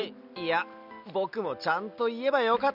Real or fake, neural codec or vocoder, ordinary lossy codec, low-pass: real; none; none; 5.4 kHz